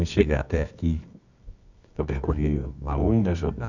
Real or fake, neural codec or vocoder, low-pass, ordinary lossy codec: fake; codec, 24 kHz, 0.9 kbps, WavTokenizer, medium music audio release; 7.2 kHz; none